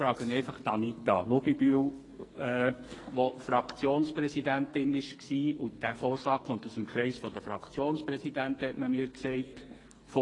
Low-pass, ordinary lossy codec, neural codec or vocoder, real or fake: 10.8 kHz; AAC, 32 kbps; codec, 44.1 kHz, 2.6 kbps, SNAC; fake